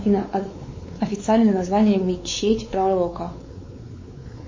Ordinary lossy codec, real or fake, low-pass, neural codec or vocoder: MP3, 32 kbps; fake; 7.2 kHz; codec, 16 kHz, 4 kbps, X-Codec, WavLM features, trained on Multilingual LibriSpeech